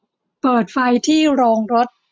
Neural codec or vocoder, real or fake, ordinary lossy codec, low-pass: none; real; none; none